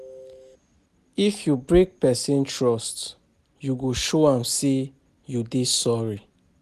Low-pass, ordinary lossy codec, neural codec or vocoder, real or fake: 14.4 kHz; none; none; real